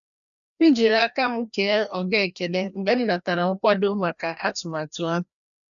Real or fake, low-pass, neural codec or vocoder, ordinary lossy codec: fake; 7.2 kHz; codec, 16 kHz, 1 kbps, FreqCodec, larger model; none